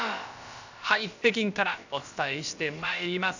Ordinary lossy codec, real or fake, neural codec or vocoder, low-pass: none; fake; codec, 16 kHz, about 1 kbps, DyCAST, with the encoder's durations; 7.2 kHz